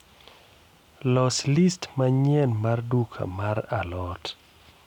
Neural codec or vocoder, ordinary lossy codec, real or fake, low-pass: none; none; real; 19.8 kHz